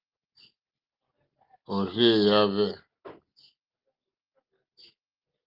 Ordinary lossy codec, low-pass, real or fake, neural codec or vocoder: Opus, 32 kbps; 5.4 kHz; real; none